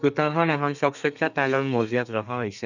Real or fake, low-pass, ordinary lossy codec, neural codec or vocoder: fake; 7.2 kHz; none; codec, 32 kHz, 1.9 kbps, SNAC